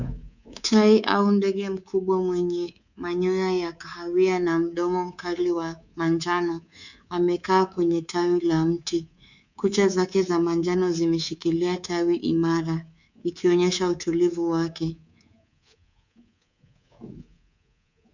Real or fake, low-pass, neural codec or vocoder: fake; 7.2 kHz; codec, 24 kHz, 3.1 kbps, DualCodec